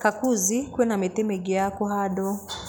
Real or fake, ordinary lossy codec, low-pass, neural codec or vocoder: real; none; none; none